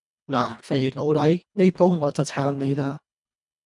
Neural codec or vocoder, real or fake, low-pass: codec, 24 kHz, 1.5 kbps, HILCodec; fake; 10.8 kHz